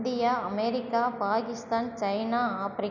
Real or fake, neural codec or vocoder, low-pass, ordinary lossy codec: real; none; 7.2 kHz; none